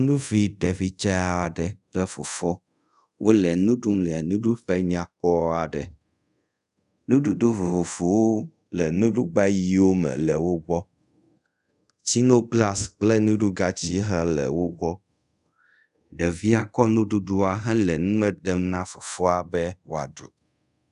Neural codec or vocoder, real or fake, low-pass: codec, 24 kHz, 0.5 kbps, DualCodec; fake; 10.8 kHz